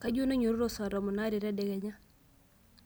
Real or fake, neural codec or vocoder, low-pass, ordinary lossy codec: real; none; none; none